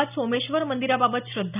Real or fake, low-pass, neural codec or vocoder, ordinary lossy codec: real; 3.6 kHz; none; none